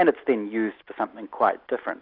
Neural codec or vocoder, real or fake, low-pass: none; real; 5.4 kHz